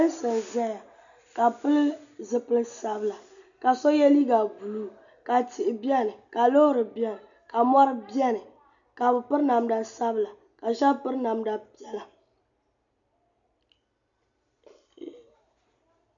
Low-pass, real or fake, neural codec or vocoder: 7.2 kHz; real; none